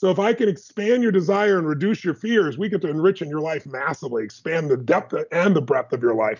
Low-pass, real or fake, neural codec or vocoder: 7.2 kHz; real; none